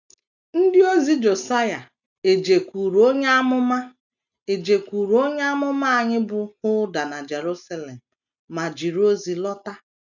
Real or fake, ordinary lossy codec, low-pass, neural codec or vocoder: real; none; 7.2 kHz; none